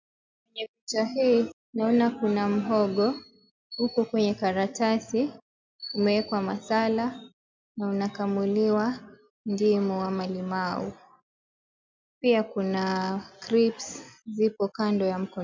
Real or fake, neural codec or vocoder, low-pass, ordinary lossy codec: real; none; 7.2 kHz; MP3, 64 kbps